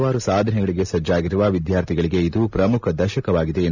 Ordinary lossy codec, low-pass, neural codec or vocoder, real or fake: none; 7.2 kHz; none; real